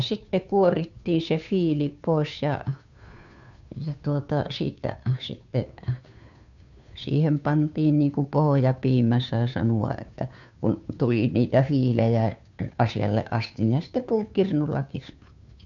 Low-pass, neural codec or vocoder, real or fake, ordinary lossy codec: 7.2 kHz; codec, 16 kHz, 2 kbps, FunCodec, trained on Chinese and English, 25 frames a second; fake; none